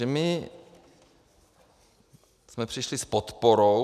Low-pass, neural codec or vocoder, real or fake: 14.4 kHz; none; real